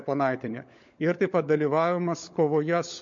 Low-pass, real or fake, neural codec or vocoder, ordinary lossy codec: 7.2 kHz; fake; codec, 16 kHz, 16 kbps, FunCodec, trained on LibriTTS, 50 frames a second; MP3, 48 kbps